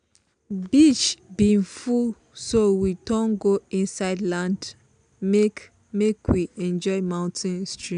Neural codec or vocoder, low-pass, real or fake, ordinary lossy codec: none; 9.9 kHz; real; none